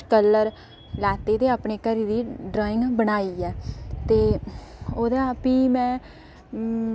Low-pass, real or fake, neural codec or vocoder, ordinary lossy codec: none; real; none; none